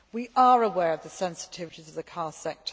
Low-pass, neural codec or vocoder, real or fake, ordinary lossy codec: none; none; real; none